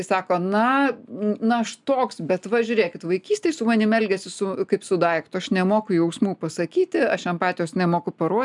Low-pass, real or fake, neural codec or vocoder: 10.8 kHz; real; none